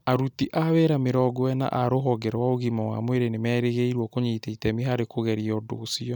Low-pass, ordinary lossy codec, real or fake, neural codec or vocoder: 19.8 kHz; none; real; none